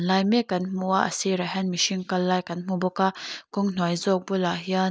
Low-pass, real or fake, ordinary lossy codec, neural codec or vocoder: none; real; none; none